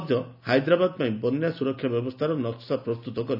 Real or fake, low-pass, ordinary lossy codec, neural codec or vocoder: real; 5.4 kHz; none; none